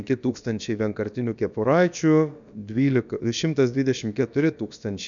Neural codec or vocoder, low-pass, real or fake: codec, 16 kHz, about 1 kbps, DyCAST, with the encoder's durations; 7.2 kHz; fake